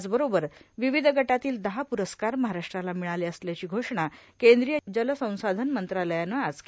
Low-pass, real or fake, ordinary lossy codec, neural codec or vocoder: none; real; none; none